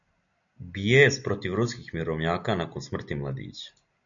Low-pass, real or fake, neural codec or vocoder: 7.2 kHz; real; none